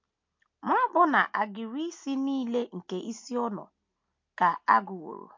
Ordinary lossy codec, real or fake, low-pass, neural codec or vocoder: MP3, 48 kbps; real; 7.2 kHz; none